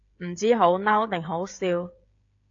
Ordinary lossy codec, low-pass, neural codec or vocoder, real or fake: AAC, 48 kbps; 7.2 kHz; codec, 16 kHz, 16 kbps, FreqCodec, smaller model; fake